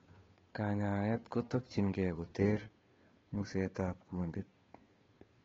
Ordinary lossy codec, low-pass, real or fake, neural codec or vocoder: AAC, 24 kbps; 7.2 kHz; fake; codec, 16 kHz, 2 kbps, FunCodec, trained on Chinese and English, 25 frames a second